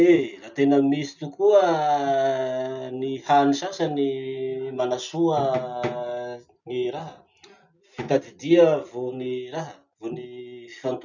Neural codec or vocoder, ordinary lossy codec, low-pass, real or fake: none; none; 7.2 kHz; real